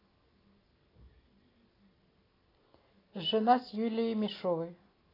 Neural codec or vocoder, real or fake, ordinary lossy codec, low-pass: none; real; AAC, 24 kbps; 5.4 kHz